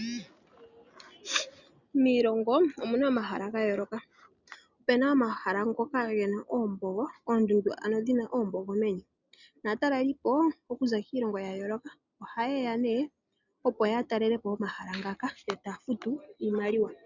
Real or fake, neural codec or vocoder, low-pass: real; none; 7.2 kHz